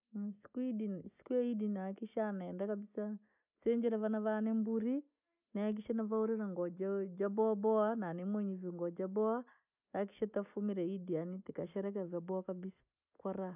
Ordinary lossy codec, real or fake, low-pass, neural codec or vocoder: none; real; 3.6 kHz; none